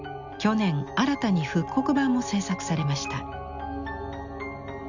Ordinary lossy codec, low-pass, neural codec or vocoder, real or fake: none; 7.2 kHz; none; real